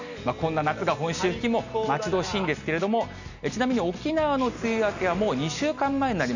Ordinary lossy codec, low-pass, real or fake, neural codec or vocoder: none; 7.2 kHz; real; none